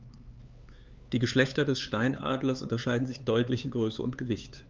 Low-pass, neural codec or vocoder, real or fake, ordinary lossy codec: 7.2 kHz; codec, 16 kHz, 4 kbps, X-Codec, HuBERT features, trained on LibriSpeech; fake; Opus, 32 kbps